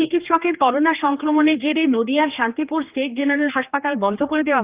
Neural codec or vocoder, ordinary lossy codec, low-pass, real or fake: codec, 16 kHz, 2 kbps, X-Codec, HuBERT features, trained on general audio; Opus, 24 kbps; 3.6 kHz; fake